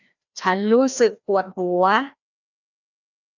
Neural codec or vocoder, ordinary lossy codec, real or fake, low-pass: codec, 16 kHz, 1 kbps, FreqCodec, larger model; none; fake; 7.2 kHz